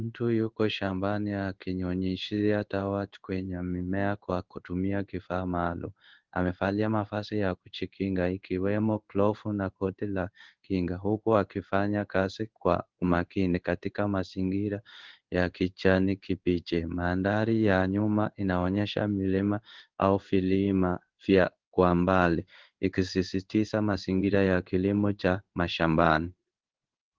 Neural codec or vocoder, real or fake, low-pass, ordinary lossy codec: codec, 16 kHz in and 24 kHz out, 1 kbps, XY-Tokenizer; fake; 7.2 kHz; Opus, 16 kbps